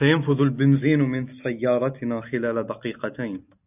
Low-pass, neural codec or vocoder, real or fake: 3.6 kHz; none; real